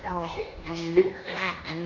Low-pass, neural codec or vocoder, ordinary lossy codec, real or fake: 7.2 kHz; codec, 16 kHz in and 24 kHz out, 0.9 kbps, LongCat-Audio-Codec, fine tuned four codebook decoder; none; fake